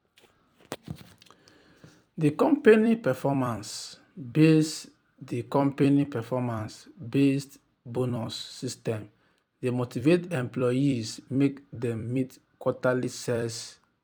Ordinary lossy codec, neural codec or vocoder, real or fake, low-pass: none; vocoder, 44.1 kHz, 128 mel bands every 256 samples, BigVGAN v2; fake; 19.8 kHz